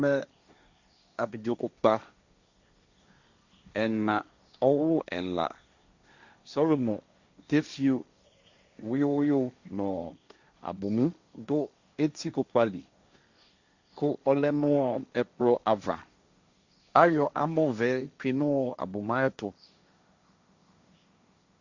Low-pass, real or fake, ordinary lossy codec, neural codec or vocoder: 7.2 kHz; fake; Opus, 64 kbps; codec, 16 kHz, 1.1 kbps, Voila-Tokenizer